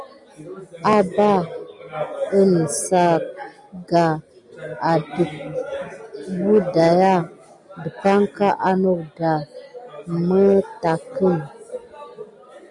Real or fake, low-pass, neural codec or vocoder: real; 10.8 kHz; none